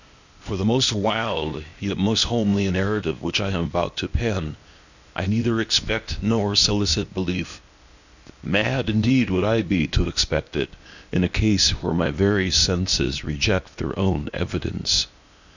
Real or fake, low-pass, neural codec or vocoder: fake; 7.2 kHz; codec, 16 kHz, 0.8 kbps, ZipCodec